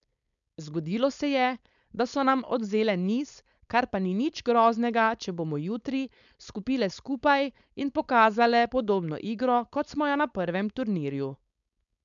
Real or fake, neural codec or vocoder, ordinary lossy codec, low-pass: fake; codec, 16 kHz, 4.8 kbps, FACodec; none; 7.2 kHz